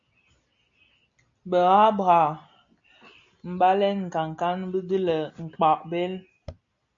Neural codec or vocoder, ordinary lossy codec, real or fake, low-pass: none; AAC, 64 kbps; real; 7.2 kHz